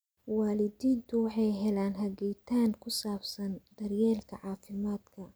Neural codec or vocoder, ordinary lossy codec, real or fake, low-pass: none; none; real; none